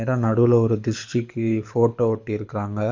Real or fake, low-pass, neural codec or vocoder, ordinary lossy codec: fake; 7.2 kHz; codec, 24 kHz, 6 kbps, HILCodec; MP3, 48 kbps